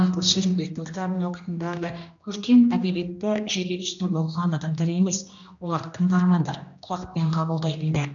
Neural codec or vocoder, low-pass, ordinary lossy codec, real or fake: codec, 16 kHz, 1 kbps, X-Codec, HuBERT features, trained on general audio; 7.2 kHz; AAC, 64 kbps; fake